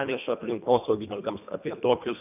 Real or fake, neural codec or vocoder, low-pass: fake; codec, 24 kHz, 1.5 kbps, HILCodec; 3.6 kHz